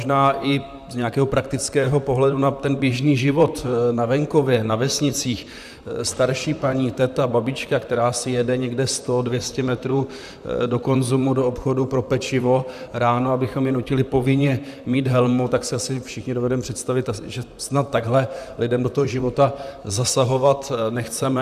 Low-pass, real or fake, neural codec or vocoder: 14.4 kHz; fake; vocoder, 44.1 kHz, 128 mel bands, Pupu-Vocoder